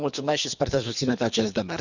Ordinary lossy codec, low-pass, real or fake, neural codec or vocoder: none; 7.2 kHz; fake; codec, 24 kHz, 3 kbps, HILCodec